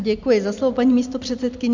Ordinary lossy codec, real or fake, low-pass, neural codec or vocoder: MP3, 64 kbps; real; 7.2 kHz; none